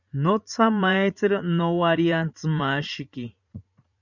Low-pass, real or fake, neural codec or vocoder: 7.2 kHz; fake; vocoder, 44.1 kHz, 80 mel bands, Vocos